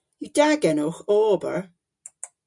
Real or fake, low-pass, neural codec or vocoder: real; 10.8 kHz; none